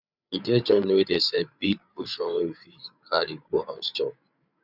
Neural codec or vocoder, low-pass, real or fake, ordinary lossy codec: codec, 16 kHz, 8 kbps, FreqCodec, larger model; 5.4 kHz; fake; none